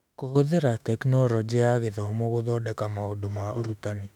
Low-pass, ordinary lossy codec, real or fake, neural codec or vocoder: 19.8 kHz; none; fake; autoencoder, 48 kHz, 32 numbers a frame, DAC-VAE, trained on Japanese speech